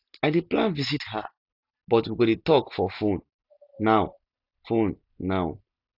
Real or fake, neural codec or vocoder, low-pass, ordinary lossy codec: real; none; 5.4 kHz; none